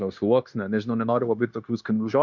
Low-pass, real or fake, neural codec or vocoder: 7.2 kHz; fake; codec, 16 kHz, 0.9 kbps, LongCat-Audio-Codec